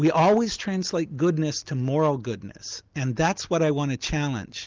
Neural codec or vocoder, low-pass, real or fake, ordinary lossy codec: none; 7.2 kHz; real; Opus, 32 kbps